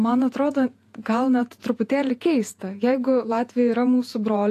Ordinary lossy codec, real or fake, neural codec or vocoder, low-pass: AAC, 64 kbps; fake; vocoder, 48 kHz, 128 mel bands, Vocos; 14.4 kHz